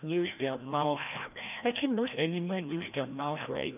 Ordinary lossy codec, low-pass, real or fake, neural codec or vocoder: none; 3.6 kHz; fake; codec, 16 kHz, 1 kbps, FreqCodec, larger model